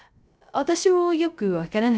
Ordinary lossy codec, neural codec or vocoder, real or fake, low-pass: none; codec, 16 kHz, 0.3 kbps, FocalCodec; fake; none